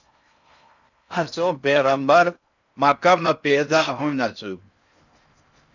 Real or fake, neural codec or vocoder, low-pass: fake; codec, 16 kHz in and 24 kHz out, 0.6 kbps, FocalCodec, streaming, 4096 codes; 7.2 kHz